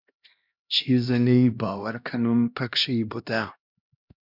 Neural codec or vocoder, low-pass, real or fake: codec, 16 kHz, 1 kbps, X-Codec, HuBERT features, trained on LibriSpeech; 5.4 kHz; fake